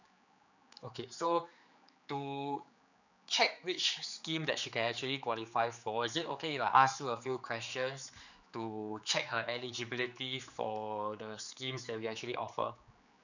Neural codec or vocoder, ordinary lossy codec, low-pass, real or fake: codec, 16 kHz, 4 kbps, X-Codec, HuBERT features, trained on general audio; none; 7.2 kHz; fake